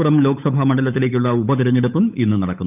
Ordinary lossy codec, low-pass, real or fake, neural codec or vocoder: none; 3.6 kHz; fake; codec, 16 kHz, 8 kbps, FunCodec, trained on Chinese and English, 25 frames a second